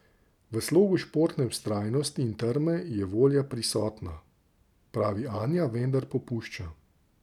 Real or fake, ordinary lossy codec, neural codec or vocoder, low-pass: real; none; none; 19.8 kHz